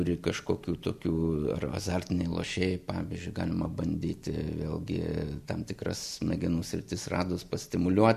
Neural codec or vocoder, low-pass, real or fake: none; 14.4 kHz; real